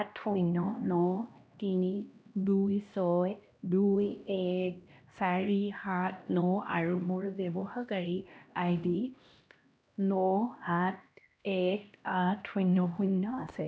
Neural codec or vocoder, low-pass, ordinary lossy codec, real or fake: codec, 16 kHz, 1 kbps, X-Codec, HuBERT features, trained on LibriSpeech; none; none; fake